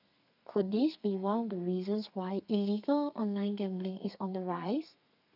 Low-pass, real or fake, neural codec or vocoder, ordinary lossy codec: 5.4 kHz; fake; codec, 32 kHz, 1.9 kbps, SNAC; none